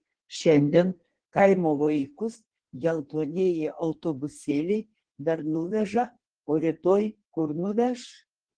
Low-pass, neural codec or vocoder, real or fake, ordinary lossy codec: 9.9 kHz; codec, 16 kHz in and 24 kHz out, 1.1 kbps, FireRedTTS-2 codec; fake; Opus, 16 kbps